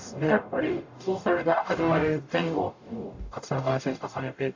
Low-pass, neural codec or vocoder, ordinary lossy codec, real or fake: 7.2 kHz; codec, 44.1 kHz, 0.9 kbps, DAC; AAC, 48 kbps; fake